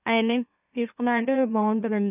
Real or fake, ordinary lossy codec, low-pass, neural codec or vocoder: fake; none; 3.6 kHz; autoencoder, 44.1 kHz, a latent of 192 numbers a frame, MeloTTS